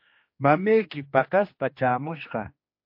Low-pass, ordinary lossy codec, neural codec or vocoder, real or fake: 5.4 kHz; MP3, 32 kbps; codec, 16 kHz, 2 kbps, X-Codec, HuBERT features, trained on general audio; fake